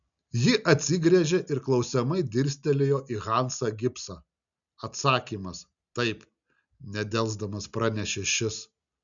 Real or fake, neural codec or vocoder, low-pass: real; none; 7.2 kHz